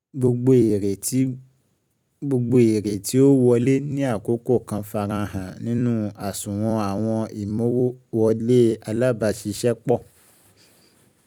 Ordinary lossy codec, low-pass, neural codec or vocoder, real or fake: none; 19.8 kHz; vocoder, 44.1 kHz, 128 mel bands every 256 samples, BigVGAN v2; fake